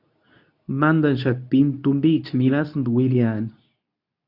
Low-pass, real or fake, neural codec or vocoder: 5.4 kHz; fake; codec, 24 kHz, 0.9 kbps, WavTokenizer, medium speech release version 2